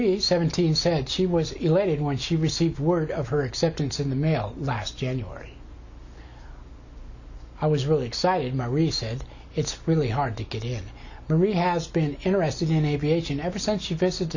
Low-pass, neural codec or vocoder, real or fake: 7.2 kHz; none; real